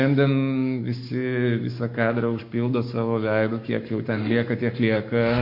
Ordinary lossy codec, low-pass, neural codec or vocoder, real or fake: MP3, 32 kbps; 5.4 kHz; codec, 44.1 kHz, 7.8 kbps, Pupu-Codec; fake